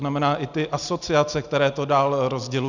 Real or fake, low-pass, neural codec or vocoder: real; 7.2 kHz; none